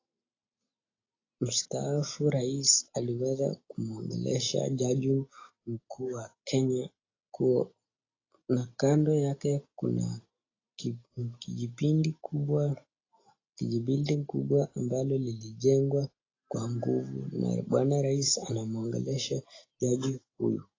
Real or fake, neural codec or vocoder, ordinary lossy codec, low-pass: real; none; AAC, 32 kbps; 7.2 kHz